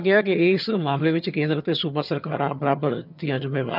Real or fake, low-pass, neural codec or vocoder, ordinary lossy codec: fake; 5.4 kHz; vocoder, 22.05 kHz, 80 mel bands, HiFi-GAN; none